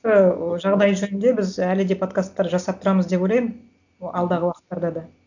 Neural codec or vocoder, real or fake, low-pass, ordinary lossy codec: none; real; 7.2 kHz; none